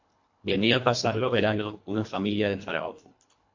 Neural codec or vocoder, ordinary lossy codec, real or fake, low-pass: codec, 24 kHz, 1.5 kbps, HILCodec; MP3, 48 kbps; fake; 7.2 kHz